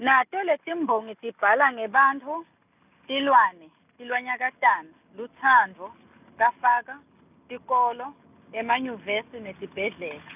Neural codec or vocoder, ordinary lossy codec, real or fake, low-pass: none; none; real; 3.6 kHz